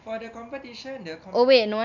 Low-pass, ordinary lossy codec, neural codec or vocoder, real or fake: 7.2 kHz; none; none; real